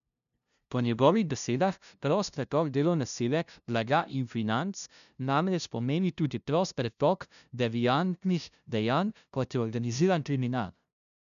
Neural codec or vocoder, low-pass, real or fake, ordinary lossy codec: codec, 16 kHz, 0.5 kbps, FunCodec, trained on LibriTTS, 25 frames a second; 7.2 kHz; fake; none